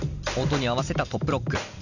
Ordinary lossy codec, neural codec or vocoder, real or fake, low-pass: none; none; real; 7.2 kHz